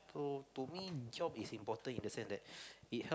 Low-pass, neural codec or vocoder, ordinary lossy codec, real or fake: none; none; none; real